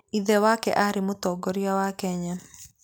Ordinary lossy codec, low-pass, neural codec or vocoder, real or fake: none; none; none; real